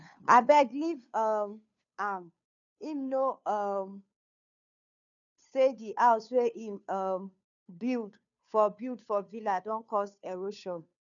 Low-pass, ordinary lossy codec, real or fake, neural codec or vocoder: 7.2 kHz; none; fake; codec, 16 kHz, 2 kbps, FunCodec, trained on Chinese and English, 25 frames a second